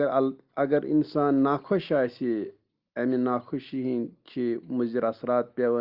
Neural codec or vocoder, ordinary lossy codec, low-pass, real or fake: none; Opus, 32 kbps; 5.4 kHz; real